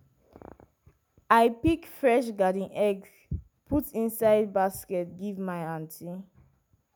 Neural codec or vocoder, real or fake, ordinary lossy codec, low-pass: none; real; none; none